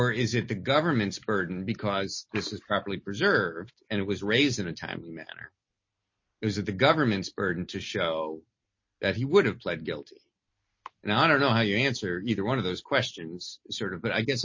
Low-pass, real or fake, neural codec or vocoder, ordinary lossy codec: 7.2 kHz; real; none; MP3, 32 kbps